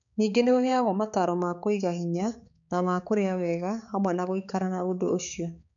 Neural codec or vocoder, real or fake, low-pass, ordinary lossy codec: codec, 16 kHz, 4 kbps, X-Codec, HuBERT features, trained on balanced general audio; fake; 7.2 kHz; none